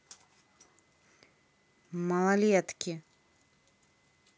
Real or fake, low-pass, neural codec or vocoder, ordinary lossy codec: real; none; none; none